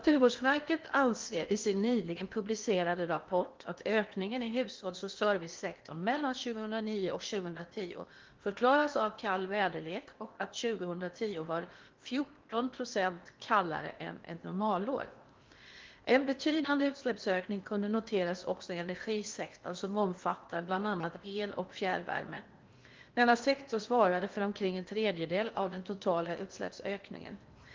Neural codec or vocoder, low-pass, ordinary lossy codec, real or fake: codec, 16 kHz in and 24 kHz out, 0.8 kbps, FocalCodec, streaming, 65536 codes; 7.2 kHz; Opus, 32 kbps; fake